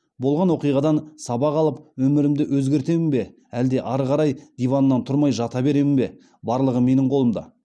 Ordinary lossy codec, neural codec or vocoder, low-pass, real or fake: none; none; none; real